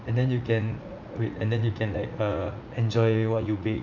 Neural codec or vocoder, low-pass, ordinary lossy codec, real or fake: vocoder, 44.1 kHz, 80 mel bands, Vocos; 7.2 kHz; none; fake